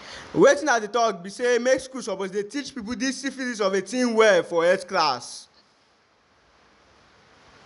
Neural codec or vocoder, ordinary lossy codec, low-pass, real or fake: none; none; 10.8 kHz; real